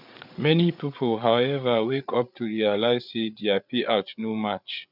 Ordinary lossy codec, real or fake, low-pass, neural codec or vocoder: none; fake; 5.4 kHz; codec, 16 kHz, 8 kbps, FreqCodec, larger model